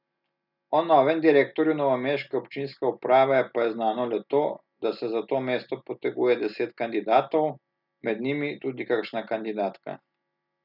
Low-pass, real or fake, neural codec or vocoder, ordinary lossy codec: 5.4 kHz; real; none; none